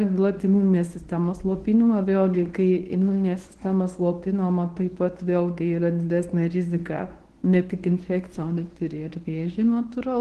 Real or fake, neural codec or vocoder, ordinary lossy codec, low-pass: fake; codec, 24 kHz, 0.9 kbps, WavTokenizer, medium speech release version 1; Opus, 16 kbps; 10.8 kHz